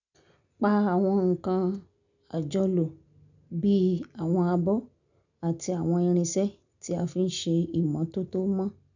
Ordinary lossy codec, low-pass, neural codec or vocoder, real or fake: none; 7.2 kHz; none; real